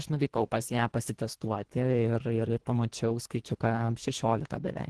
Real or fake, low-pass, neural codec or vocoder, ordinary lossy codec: fake; 10.8 kHz; codec, 24 kHz, 1.5 kbps, HILCodec; Opus, 16 kbps